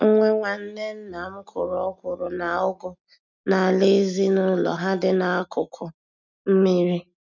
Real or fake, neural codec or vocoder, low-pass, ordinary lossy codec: real; none; 7.2 kHz; none